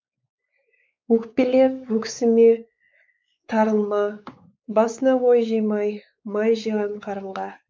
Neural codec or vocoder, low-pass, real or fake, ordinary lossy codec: codec, 16 kHz, 4 kbps, X-Codec, WavLM features, trained on Multilingual LibriSpeech; none; fake; none